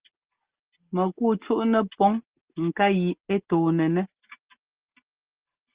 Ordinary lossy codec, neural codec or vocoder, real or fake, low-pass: Opus, 16 kbps; none; real; 3.6 kHz